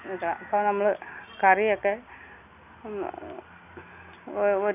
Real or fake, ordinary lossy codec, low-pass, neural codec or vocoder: real; none; 3.6 kHz; none